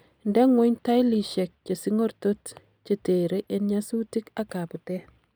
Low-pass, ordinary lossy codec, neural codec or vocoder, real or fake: none; none; none; real